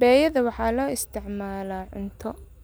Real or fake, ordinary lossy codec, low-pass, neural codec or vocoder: real; none; none; none